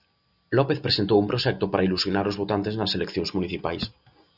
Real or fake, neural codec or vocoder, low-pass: real; none; 5.4 kHz